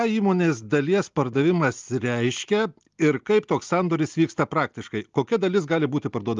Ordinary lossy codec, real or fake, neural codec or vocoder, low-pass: Opus, 32 kbps; real; none; 7.2 kHz